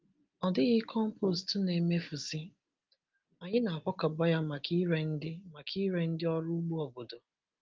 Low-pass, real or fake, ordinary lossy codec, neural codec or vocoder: 7.2 kHz; real; Opus, 32 kbps; none